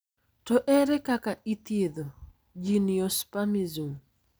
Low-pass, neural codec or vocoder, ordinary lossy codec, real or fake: none; none; none; real